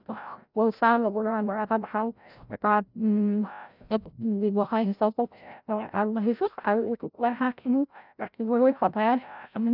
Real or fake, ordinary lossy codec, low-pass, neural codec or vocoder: fake; none; 5.4 kHz; codec, 16 kHz, 0.5 kbps, FreqCodec, larger model